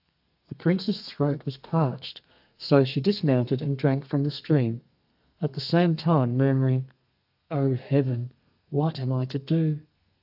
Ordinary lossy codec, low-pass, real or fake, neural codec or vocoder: AAC, 48 kbps; 5.4 kHz; fake; codec, 32 kHz, 1.9 kbps, SNAC